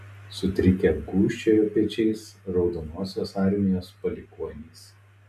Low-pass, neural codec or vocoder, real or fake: 14.4 kHz; none; real